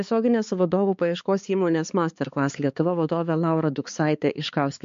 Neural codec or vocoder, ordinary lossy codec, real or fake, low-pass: codec, 16 kHz, 4 kbps, X-Codec, HuBERT features, trained on balanced general audio; MP3, 48 kbps; fake; 7.2 kHz